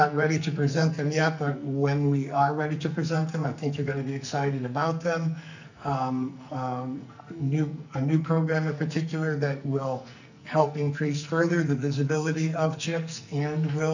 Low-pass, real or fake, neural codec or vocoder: 7.2 kHz; fake; codec, 44.1 kHz, 2.6 kbps, SNAC